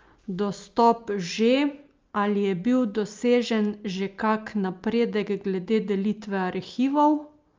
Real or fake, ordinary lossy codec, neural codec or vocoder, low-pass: real; Opus, 24 kbps; none; 7.2 kHz